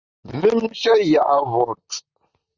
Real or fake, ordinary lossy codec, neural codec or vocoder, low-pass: fake; Opus, 64 kbps; vocoder, 44.1 kHz, 128 mel bands, Pupu-Vocoder; 7.2 kHz